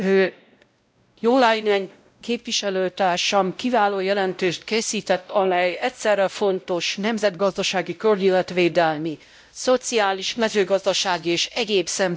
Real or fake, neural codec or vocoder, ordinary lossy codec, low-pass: fake; codec, 16 kHz, 0.5 kbps, X-Codec, WavLM features, trained on Multilingual LibriSpeech; none; none